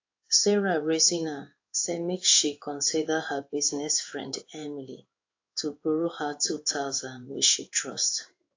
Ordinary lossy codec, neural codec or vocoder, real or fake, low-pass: AAC, 48 kbps; codec, 16 kHz in and 24 kHz out, 1 kbps, XY-Tokenizer; fake; 7.2 kHz